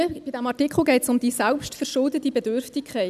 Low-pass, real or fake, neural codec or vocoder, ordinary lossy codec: 14.4 kHz; real; none; none